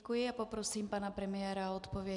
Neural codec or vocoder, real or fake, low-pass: none; real; 10.8 kHz